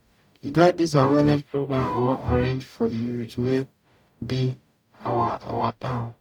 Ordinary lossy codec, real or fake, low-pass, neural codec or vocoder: none; fake; 19.8 kHz; codec, 44.1 kHz, 0.9 kbps, DAC